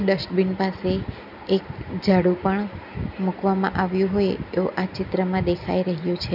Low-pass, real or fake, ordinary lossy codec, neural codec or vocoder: 5.4 kHz; real; none; none